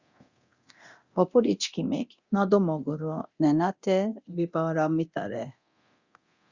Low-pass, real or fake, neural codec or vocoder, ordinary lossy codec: 7.2 kHz; fake; codec, 24 kHz, 0.9 kbps, DualCodec; Opus, 64 kbps